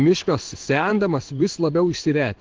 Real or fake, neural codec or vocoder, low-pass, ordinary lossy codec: fake; codec, 16 kHz in and 24 kHz out, 1 kbps, XY-Tokenizer; 7.2 kHz; Opus, 16 kbps